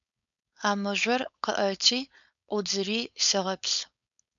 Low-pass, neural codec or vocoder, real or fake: 7.2 kHz; codec, 16 kHz, 4.8 kbps, FACodec; fake